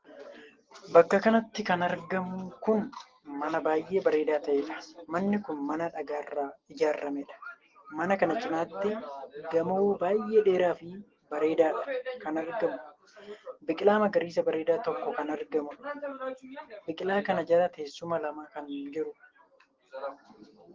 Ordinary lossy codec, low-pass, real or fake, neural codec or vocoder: Opus, 16 kbps; 7.2 kHz; real; none